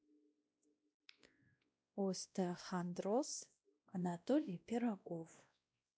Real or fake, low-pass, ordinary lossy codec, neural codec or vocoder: fake; none; none; codec, 16 kHz, 1 kbps, X-Codec, WavLM features, trained on Multilingual LibriSpeech